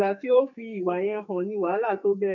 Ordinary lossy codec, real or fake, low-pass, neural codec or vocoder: none; fake; 7.2 kHz; codec, 44.1 kHz, 2.6 kbps, SNAC